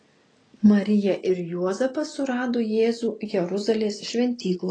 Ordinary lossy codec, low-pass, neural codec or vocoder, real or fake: AAC, 32 kbps; 9.9 kHz; none; real